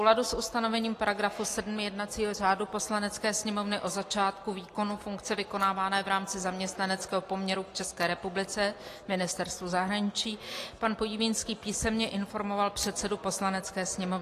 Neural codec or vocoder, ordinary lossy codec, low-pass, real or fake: none; AAC, 48 kbps; 14.4 kHz; real